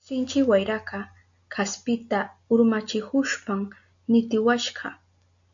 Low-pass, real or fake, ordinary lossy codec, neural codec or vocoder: 7.2 kHz; real; MP3, 64 kbps; none